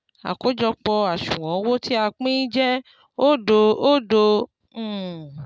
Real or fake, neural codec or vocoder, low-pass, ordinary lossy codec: real; none; none; none